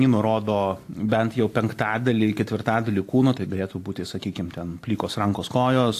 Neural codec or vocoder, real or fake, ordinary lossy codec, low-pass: none; real; AAC, 64 kbps; 14.4 kHz